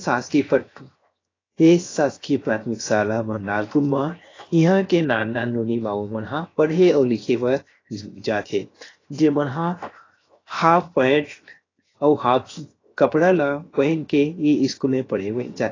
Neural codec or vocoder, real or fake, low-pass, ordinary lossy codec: codec, 16 kHz, 0.7 kbps, FocalCodec; fake; 7.2 kHz; AAC, 32 kbps